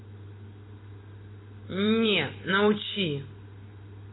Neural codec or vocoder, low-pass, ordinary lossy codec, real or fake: vocoder, 44.1 kHz, 128 mel bands every 256 samples, BigVGAN v2; 7.2 kHz; AAC, 16 kbps; fake